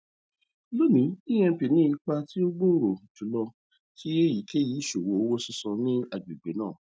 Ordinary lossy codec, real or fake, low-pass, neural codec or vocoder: none; real; none; none